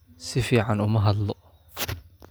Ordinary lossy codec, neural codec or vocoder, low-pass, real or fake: none; none; none; real